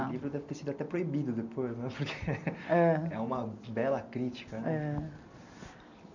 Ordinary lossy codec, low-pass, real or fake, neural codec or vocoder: none; 7.2 kHz; real; none